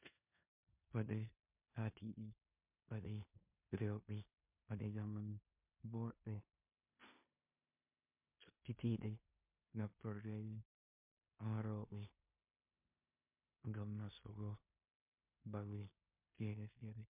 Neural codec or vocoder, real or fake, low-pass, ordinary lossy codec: codec, 16 kHz in and 24 kHz out, 0.9 kbps, LongCat-Audio-Codec, four codebook decoder; fake; 3.6 kHz; MP3, 32 kbps